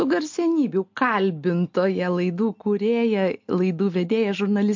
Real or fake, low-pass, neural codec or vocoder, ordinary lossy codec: real; 7.2 kHz; none; MP3, 48 kbps